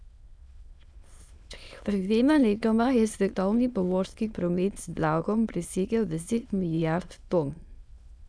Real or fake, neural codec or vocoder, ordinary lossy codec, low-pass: fake; autoencoder, 22.05 kHz, a latent of 192 numbers a frame, VITS, trained on many speakers; none; none